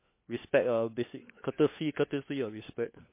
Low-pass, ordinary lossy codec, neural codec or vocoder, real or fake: 3.6 kHz; MP3, 24 kbps; codec, 16 kHz, 4 kbps, FunCodec, trained on LibriTTS, 50 frames a second; fake